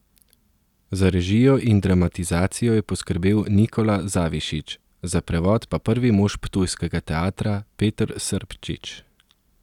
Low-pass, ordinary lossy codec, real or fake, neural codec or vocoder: 19.8 kHz; none; real; none